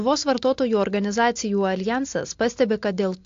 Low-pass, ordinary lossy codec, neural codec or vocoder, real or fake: 7.2 kHz; AAC, 48 kbps; none; real